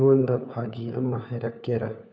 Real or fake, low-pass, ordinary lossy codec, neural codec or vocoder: fake; none; none; codec, 16 kHz, 4 kbps, FunCodec, trained on LibriTTS, 50 frames a second